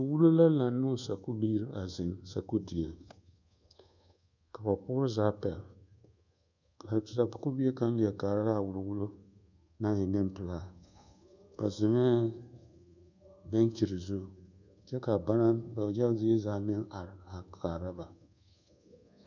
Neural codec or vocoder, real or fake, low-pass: codec, 24 kHz, 1.2 kbps, DualCodec; fake; 7.2 kHz